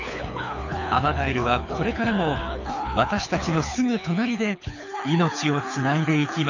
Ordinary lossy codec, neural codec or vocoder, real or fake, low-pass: none; codec, 24 kHz, 6 kbps, HILCodec; fake; 7.2 kHz